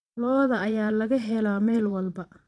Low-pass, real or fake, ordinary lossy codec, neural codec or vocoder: none; fake; none; vocoder, 22.05 kHz, 80 mel bands, Vocos